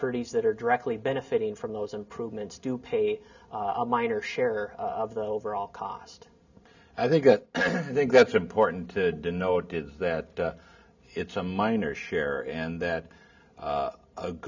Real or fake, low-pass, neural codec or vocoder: real; 7.2 kHz; none